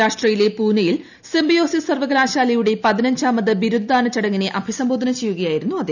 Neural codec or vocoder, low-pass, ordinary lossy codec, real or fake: none; 7.2 kHz; none; real